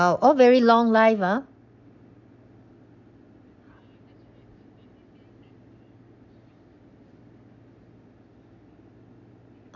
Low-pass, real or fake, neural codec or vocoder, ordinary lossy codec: 7.2 kHz; real; none; none